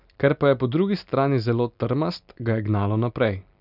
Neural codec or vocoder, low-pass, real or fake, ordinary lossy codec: none; 5.4 kHz; real; none